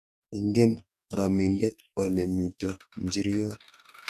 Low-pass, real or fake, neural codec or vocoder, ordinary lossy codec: 14.4 kHz; fake; codec, 44.1 kHz, 2.6 kbps, DAC; none